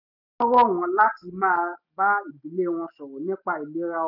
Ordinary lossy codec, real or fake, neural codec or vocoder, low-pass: none; real; none; 5.4 kHz